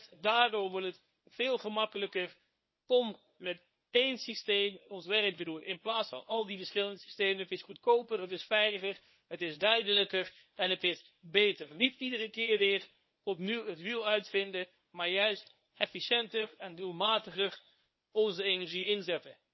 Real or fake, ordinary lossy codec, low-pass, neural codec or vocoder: fake; MP3, 24 kbps; 7.2 kHz; codec, 24 kHz, 0.9 kbps, WavTokenizer, medium speech release version 1